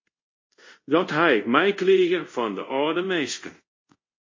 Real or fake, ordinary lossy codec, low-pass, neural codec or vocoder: fake; MP3, 32 kbps; 7.2 kHz; codec, 24 kHz, 0.5 kbps, DualCodec